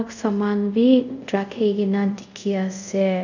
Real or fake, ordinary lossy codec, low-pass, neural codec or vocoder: fake; none; 7.2 kHz; codec, 24 kHz, 0.5 kbps, DualCodec